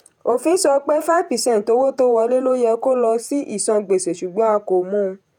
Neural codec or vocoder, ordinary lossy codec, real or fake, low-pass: vocoder, 48 kHz, 128 mel bands, Vocos; none; fake; 19.8 kHz